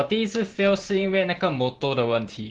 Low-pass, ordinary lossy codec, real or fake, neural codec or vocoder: 9.9 kHz; Opus, 16 kbps; fake; vocoder, 44.1 kHz, 128 mel bands every 512 samples, BigVGAN v2